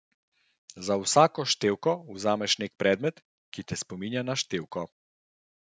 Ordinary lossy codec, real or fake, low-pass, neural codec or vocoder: none; real; none; none